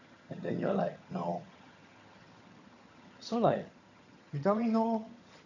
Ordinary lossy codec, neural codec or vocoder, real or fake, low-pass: none; vocoder, 22.05 kHz, 80 mel bands, HiFi-GAN; fake; 7.2 kHz